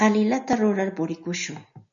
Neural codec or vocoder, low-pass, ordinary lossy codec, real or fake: none; 7.2 kHz; MP3, 96 kbps; real